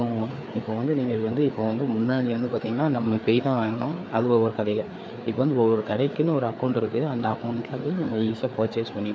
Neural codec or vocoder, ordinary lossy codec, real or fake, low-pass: codec, 16 kHz, 4 kbps, FreqCodec, larger model; none; fake; none